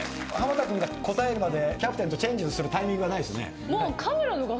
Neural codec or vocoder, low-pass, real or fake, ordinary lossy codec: none; none; real; none